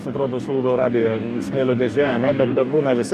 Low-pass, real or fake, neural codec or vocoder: 14.4 kHz; fake; codec, 44.1 kHz, 2.6 kbps, DAC